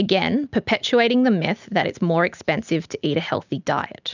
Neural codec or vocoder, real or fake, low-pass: none; real; 7.2 kHz